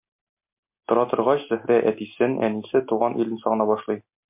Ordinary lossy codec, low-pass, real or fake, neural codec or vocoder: MP3, 32 kbps; 3.6 kHz; real; none